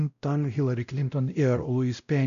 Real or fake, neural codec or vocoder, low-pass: fake; codec, 16 kHz, 0.5 kbps, X-Codec, WavLM features, trained on Multilingual LibriSpeech; 7.2 kHz